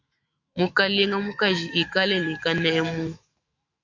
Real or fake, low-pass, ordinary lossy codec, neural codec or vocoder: fake; 7.2 kHz; Opus, 64 kbps; autoencoder, 48 kHz, 128 numbers a frame, DAC-VAE, trained on Japanese speech